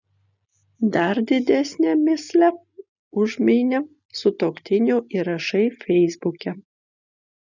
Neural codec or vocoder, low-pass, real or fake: none; 7.2 kHz; real